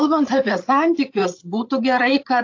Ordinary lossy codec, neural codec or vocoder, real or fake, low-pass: AAC, 48 kbps; codec, 16 kHz, 8 kbps, FreqCodec, larger model; fake; 7.2 kHz